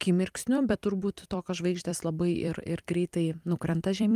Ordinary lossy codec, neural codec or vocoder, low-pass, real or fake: Opus, 32 kbps; vocoder, 44.1 kHz, 128 mel bands every 256 samples, BigVGAN v2; 14.4 kHz; fake